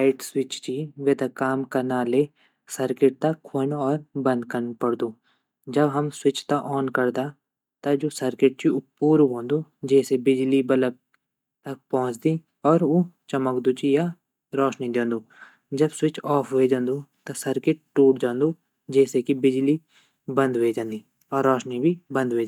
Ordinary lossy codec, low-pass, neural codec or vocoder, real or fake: none; 19.8 kHz; none; real